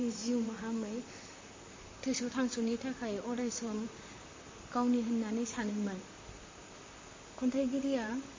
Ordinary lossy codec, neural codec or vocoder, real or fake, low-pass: MP3, 32 kbps; vocoder, 44.1 kHz, 128 mel bands, Pupu-Vocoder; fake; 7.2 kHz